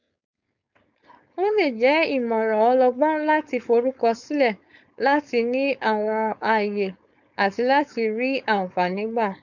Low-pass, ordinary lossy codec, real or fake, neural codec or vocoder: 7.2 kHz; none; fake; codec, 16 kHz, 4.8 kbps, FACodec